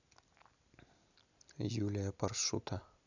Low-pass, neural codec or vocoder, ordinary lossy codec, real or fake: 7.2 kHz; none; none; real